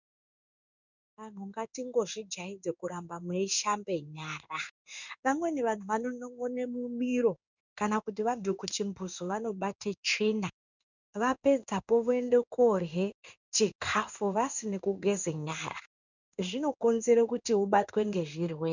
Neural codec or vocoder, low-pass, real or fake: codec, 16 kHz in and 24 kHz out, 1 kbps, XY-Tokenizer; 7.2 kHz; fake